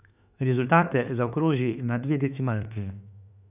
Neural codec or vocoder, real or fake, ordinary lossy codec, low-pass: autoencoder, 48 kHz, 32 numbers a frame, DAC-VAE, trained on Japanese speech; fake; none; 3.6 kHz